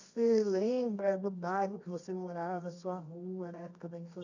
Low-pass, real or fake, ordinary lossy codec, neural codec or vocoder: 7.2 kHz; fake; none; codec, 24 kHz, 0.9 kbps, WavTokenizer, medium music audio release